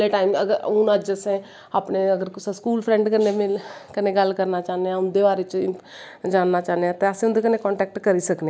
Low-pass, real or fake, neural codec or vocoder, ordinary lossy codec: none; real; none; none